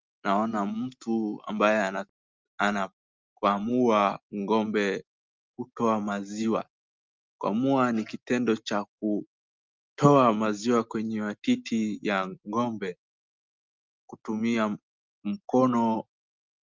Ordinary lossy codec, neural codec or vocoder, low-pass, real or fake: Opus, 24 kbps; none; 7.2 kHz; real